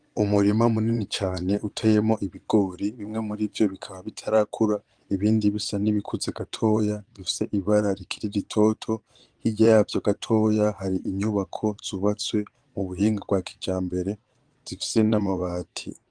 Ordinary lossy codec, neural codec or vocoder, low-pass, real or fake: Opus, 24 kbps; vocoder, 22.05 kHz, 80 mel bands, Vocos; 9.9 kHz; fake